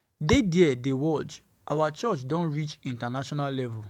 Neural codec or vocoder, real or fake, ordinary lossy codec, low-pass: codec, 44.1 kHz, 7.8 kbps, DAC; fake; MP3, 96 kbps; 19.8 kHz